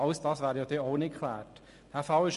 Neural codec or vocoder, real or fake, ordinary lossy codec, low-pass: none; real; MP3, 48 kbps; 14.4 kHz